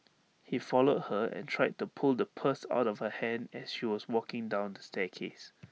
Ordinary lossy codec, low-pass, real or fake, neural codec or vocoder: none; none; real; none